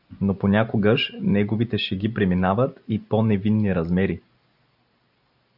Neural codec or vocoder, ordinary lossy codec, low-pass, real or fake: none; AAC, 48 kbps; 5.4 kHz; real